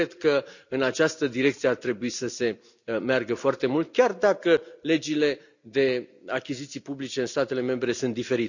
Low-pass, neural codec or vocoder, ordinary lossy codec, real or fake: 7.2 kHz; none; none; real